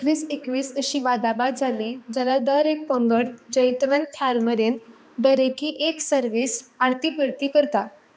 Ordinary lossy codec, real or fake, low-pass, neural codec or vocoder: none; fake; none; codec, 16 kHz, 2 kbps, X-Codec, HuBERT features, trained on balanced general audio